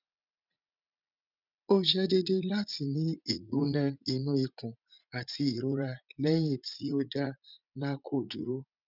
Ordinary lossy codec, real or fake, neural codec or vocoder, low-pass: none; fake; vocoder, 44.1 kHz, 80 mel bands, Vocos; 5.4 kHz